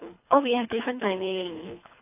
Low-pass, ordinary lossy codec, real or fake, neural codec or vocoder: 3.6 kHz; AAC, 32 kbps; fake; codec, 24 kHz, 3 kbps, HILCodec